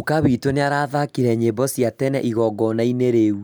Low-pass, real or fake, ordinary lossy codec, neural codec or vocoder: none; real; none; none